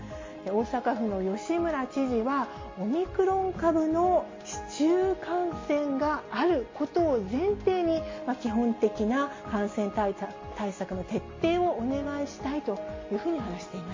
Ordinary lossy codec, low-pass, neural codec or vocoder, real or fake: MP3, 32 kbps; 7.2 kHz; none; real